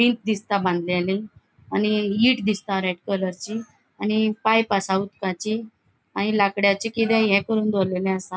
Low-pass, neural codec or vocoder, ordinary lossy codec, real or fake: none; none; none; real